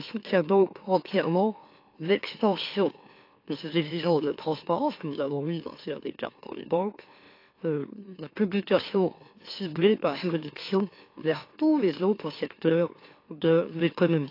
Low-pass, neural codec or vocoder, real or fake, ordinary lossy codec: 5.4 kHz; autoencoder, 44.1 kHz, a latent of 192 numbers a frame, MeloTTS; fake; AAC, 32 kbps